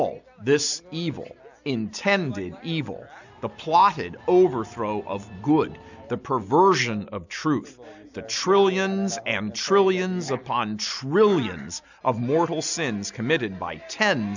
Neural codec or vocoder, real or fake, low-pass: none; real; 7.2 kHz